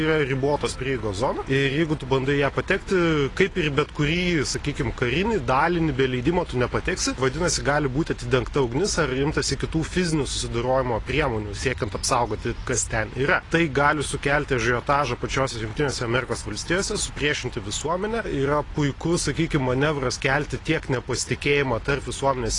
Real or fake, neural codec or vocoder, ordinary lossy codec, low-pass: real; none; AAC, 32 kbps; 10.8 kHz